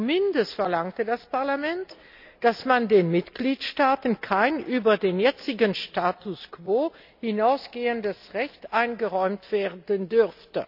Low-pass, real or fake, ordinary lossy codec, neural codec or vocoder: 5.4 kHz; real; none; none